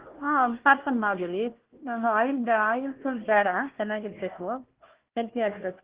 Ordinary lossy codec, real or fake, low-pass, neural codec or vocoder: Opus, 16 kbps; fake; 3.6 kHz; codec, 16 kHz, 1 kbps, FunCodec, trained on Chinese and English, 50 frames a second